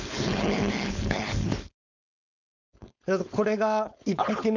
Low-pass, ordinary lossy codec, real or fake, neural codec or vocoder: 7.2 kHz; none; fake; codec, 16 kHz, 4.8 kbps, FACodec